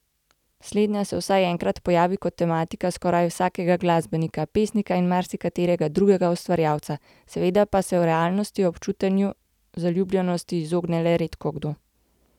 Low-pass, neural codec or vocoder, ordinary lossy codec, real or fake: 19.8 kHz; none; none; real